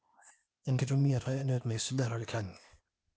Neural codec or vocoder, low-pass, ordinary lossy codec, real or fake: codec, 16 kHz, 0.8 kbps, ZipCodec; none; none; fake